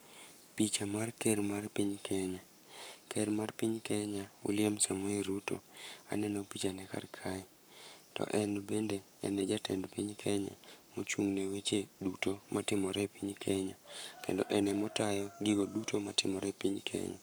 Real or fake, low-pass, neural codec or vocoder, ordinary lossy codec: fake; none; codec, 44.1 kHz, 7.8 kbps, Pupu-Codec; none